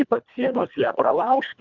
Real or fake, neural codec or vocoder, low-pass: fake; codec, 24 kHz, 1.5 kbps, HILCodec; 7.2 kHz